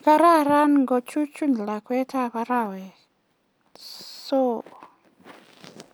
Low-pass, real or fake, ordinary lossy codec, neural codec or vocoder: none; real; none; none